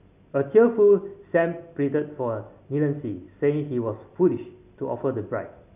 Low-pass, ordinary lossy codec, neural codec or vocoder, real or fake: 3.6 kHz; none; none; real